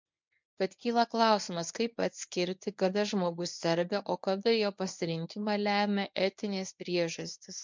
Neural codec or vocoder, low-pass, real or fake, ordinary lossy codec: codec, 24 kHz, 0.9 kbps, WavTokenizer, medium speech release version 1; 7.2 kHz; fake; MP3, 64 kbps